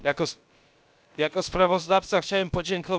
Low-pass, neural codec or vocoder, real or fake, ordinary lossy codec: none; codec, 16 kHz, about 1 kbps, DyCAST, with the encoder's durations; fake; none